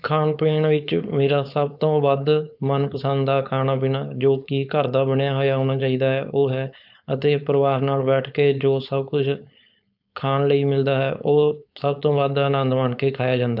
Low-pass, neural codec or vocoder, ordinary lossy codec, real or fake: 5.4 kHz; codec, 16 kHz, 4.8 kbps, FACodec; none; fake